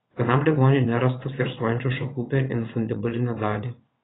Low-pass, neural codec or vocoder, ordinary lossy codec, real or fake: 7.2 kHz; vocoder, 22.05 kHz, 80 mel bands, WaveNeXt; AAC, 16 kbps; fake